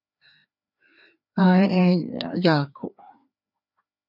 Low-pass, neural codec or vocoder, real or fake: 5.4 kHz; codec, 16 kHz, 2 kbps, FreqCodec, larger model; fake